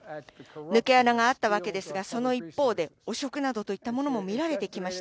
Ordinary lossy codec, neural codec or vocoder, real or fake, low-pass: none; none; real; none